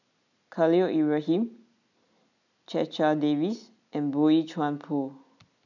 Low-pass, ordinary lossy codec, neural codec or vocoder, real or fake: 7.2 kHz; none; none; real